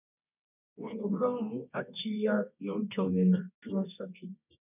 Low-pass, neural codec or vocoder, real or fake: 3.6 kHz; codec, 24 kHz, 0.9 kbps, WavTokenizer, medium music audio release; fake